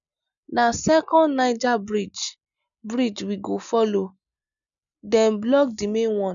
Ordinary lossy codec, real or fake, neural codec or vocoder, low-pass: none; real; none; 7.2 kHz